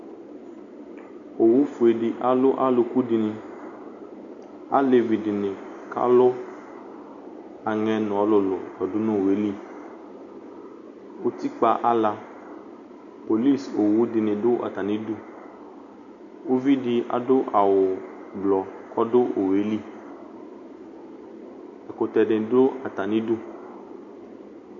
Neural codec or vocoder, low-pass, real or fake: none; 7.2 kHz; real